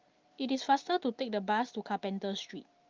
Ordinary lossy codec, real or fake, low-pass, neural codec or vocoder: Opus, 32 kbps; real; 7.2 kHz; none